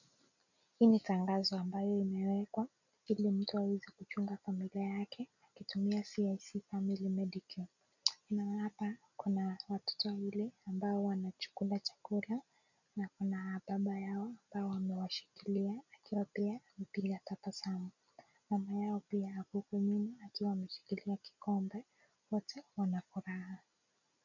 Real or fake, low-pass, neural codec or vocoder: real; 7.2 kHz; none